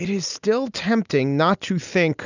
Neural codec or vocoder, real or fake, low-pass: none; real; 7.2 kHz